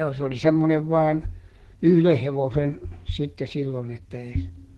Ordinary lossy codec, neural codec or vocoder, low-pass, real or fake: Opus, 16 kbps; codec, 32 kHz, 1.9 kbps, SNAC; 14.4 kHz; fake